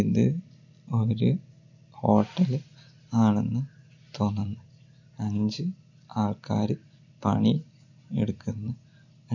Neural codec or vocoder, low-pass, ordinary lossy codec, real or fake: none; 7.2 kHz; none; real